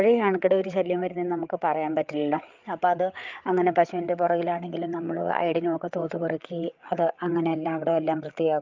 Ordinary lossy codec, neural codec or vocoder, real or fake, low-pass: Opus, 24 kbps; codec, 16 kHz, 8 kbps, FreqCodec, larger model; fake; 7.2 kHz